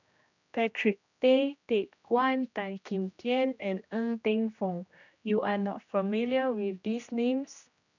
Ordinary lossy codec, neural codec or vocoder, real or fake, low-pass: none; codec, 16 kHz, 1 kbps, X-Codec, HuBERT features, trained on general audio; fake; 7.2 kHz